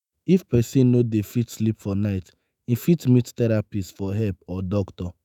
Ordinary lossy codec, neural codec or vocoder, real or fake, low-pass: none; autoencoder, 48 kHz, 128 numbers a frame, DAC-VAE, trained on Japanese speech; fake; 19.8 kHz